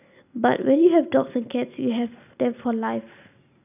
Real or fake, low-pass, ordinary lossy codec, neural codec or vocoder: real; 3.6 kHz; none; none